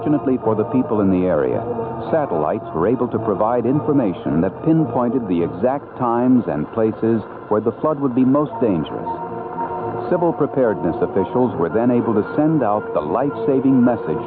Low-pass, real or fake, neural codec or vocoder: 5.4 kHz; real; none